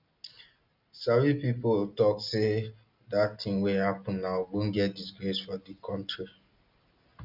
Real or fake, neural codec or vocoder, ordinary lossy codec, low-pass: real; none; none; 5.4 kHz